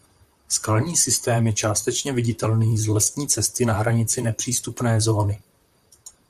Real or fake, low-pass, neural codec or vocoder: fake; 14.4 kHz; vocoder, 44.1 kHz, 128 mel bands, Pupu-Vocoder